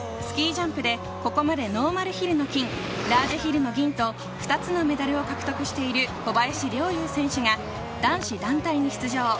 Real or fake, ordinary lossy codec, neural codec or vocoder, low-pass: real; none; none; none